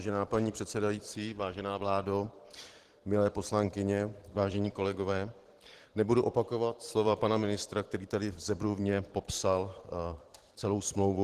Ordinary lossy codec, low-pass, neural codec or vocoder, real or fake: Opus, 16 kbps; 14.4 kHz; vocoder, 44.1 kHz, 128 mel bands every 512 samples, BigVGAN v2; fake